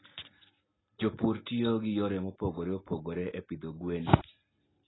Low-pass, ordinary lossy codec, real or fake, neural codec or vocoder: 7.2 kHz; AAC, 16 kbps; real; none